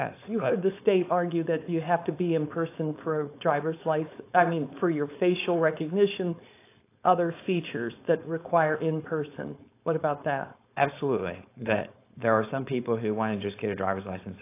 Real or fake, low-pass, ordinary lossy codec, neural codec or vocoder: fake; 3.6 kHz; AAC, 24 kbps; codec, 16 kHz, 4.8 kbps, FACodec